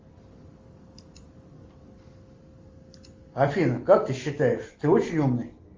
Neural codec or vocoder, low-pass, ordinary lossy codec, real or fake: none; 7.2 kHz; Opus, 32 kbps; real